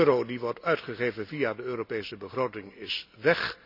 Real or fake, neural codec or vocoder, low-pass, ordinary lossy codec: real; none; 5.4 kHz; none